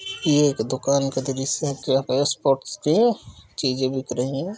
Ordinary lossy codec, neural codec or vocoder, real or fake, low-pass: none; none; real; none